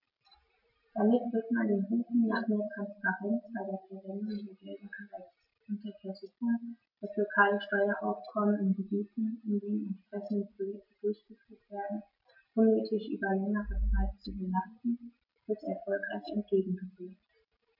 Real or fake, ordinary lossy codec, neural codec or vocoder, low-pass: real; none; none; 5.4 kHz